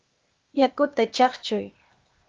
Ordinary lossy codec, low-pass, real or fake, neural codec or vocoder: Opus, 32 kbps; 7.2 kHz; fake; codec, 16 kHz, 0.8 kbps, ZipCodec